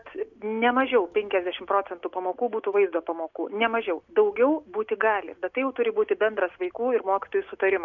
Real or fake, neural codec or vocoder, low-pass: real; none; 7.2 kHz